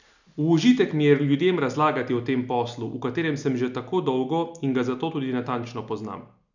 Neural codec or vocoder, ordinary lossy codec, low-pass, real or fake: none; none; 7.2 kHz; real